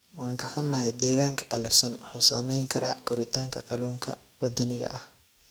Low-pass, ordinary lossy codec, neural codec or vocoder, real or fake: none; none; codec, 44.1 kHz, 2.6 kbps, DAC; fake